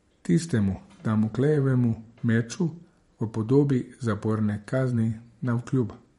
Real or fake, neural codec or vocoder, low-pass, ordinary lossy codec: fake; vocoder, 44.1 kHz, 128 mel bands every 512 samples, BigVGAN v2; 19.8 kHz; MP3, 48 kbps